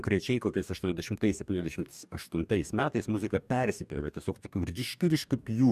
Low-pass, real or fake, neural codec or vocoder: 14.4 kHz; fake; codec, 44.1 kHz, 2.6 kbps, DAC